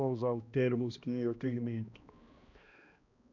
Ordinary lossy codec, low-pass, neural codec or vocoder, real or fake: none; 7.2 kHz; codec, 16 kHz, 1 kbps, X-Codec, HuBERT features, trained on balanced general audio; fake